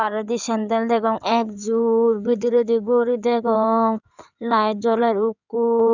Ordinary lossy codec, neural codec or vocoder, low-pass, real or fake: none; codec, 16 kHz in and 24 kHz out, 2.2 kbps, FireRedTTS-2 codec; 7.2 kHz; fake